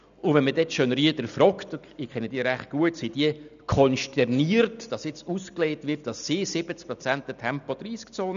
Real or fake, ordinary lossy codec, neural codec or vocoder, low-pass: real; none; none; 7.2 kHz